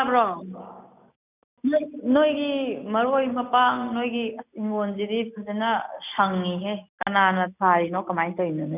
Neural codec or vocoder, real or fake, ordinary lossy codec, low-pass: none; real; none; 3.6 kHz